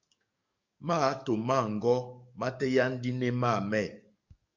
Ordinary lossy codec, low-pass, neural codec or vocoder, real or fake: Opus, 64 kbps; 7.2 kHz; codec, 44.1 kHz, 7.8 kbps, DAC; fake